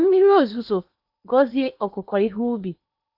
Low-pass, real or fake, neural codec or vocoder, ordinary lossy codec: 5.4 kHz; fake; codec, 16 kHz in and 24 kHz out, 0.8 kbps, FocalCodec, streaming, 65536 codes; none